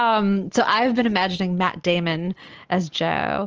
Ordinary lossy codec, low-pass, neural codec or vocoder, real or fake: Opus, 24 kbps; 7.2 kHz; none; real